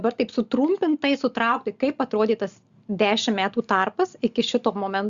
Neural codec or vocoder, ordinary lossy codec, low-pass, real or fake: none; Opus, 64 kbps; 7.2 kHz; real